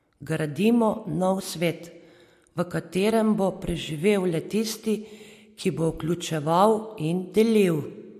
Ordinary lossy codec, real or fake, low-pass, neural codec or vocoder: MP3, 64 kbps; real; 14.4 kHz; none